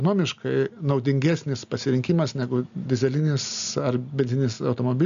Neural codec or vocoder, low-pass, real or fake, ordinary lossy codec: none; 7.2 kHz; real; MP3, 64 kbps